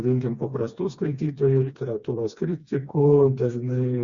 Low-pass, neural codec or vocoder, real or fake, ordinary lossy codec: 7.2 kHz; codec, 16 kHz, 2 kbps, FreqCodec, smaller model; fake; Opus, 64 kbps